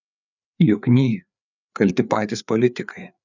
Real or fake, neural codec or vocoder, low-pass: fake; codec, 16 kHz, 4 kbps, FreqCodec, larger model; 7.2 kHz